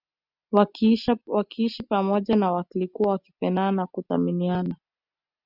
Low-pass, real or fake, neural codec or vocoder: 5.4 kHz; real; none